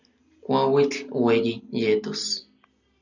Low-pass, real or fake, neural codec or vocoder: 7.2 kHz; real; none